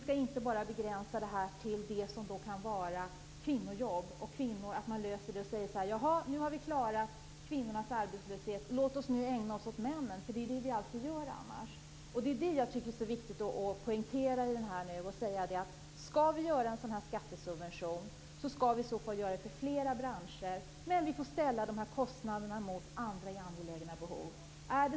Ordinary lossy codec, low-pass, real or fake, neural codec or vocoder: none; none; real; none